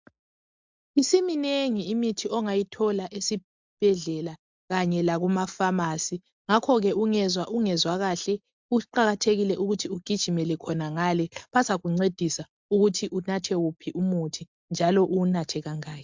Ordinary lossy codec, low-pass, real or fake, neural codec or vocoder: MP3, 64 kbps; 7.2 kHz; real; none